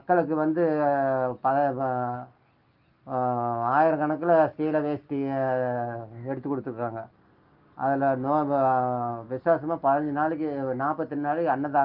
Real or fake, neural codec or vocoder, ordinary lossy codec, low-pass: real; none; Opus, 24 kbps; 5.4 kHz